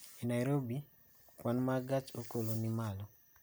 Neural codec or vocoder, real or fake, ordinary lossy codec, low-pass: none; real; none; none